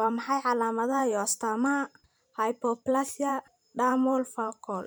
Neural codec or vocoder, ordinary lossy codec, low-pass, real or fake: vocoder, 44.1 kHz, 128 mel bands every 512 samples, BigVGAN v2; none; none; fake